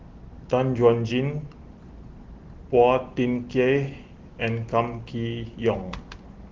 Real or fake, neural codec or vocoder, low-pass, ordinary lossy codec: real; none; 7.2 kHz; Opus, 16 kbps